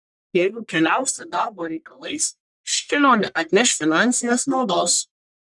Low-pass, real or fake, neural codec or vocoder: 10.8 kHz; fake; codec, 44.1 kHz, 1.7 kbps, Pupu-Codec